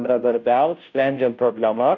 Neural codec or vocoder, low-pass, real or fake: codec, 16 kHz, 0.5 kbps, FunCodec, trained on Chinese and English, 25 frames a second; 7.2 kHz; fake